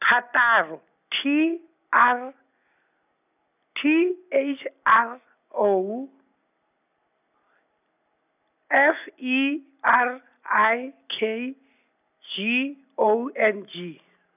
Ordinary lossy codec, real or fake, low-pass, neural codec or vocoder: none; real; 3.6 kHz; none